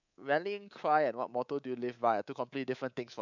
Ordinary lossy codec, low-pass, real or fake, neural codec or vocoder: none; 7.2 kHz; fake; codec, 24 kHz, 3.1 kbps, DualCodec